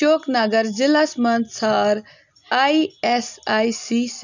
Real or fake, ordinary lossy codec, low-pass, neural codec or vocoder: real; none; 7.2 kHz; none